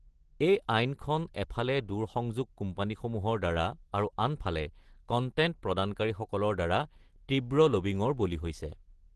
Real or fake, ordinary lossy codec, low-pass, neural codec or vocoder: real; Opus, 16 kbps; 10.8 kHz; none